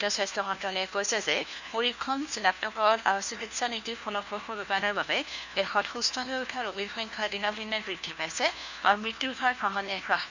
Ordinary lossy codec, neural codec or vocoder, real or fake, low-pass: none; codec, 16 kHz, 1 kbps, FunCodec, trained on LibriTTS, 50 frames a second; fake; 7.2 kHz